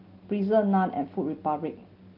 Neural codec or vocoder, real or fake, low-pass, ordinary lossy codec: none; real; 5.4 kHz; Opus, 32 kbps